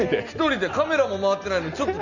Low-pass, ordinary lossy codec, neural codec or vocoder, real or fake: 7.2 kHz; none; none; real